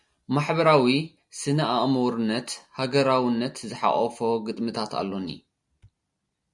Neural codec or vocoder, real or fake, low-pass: none; real; 10.8 kHz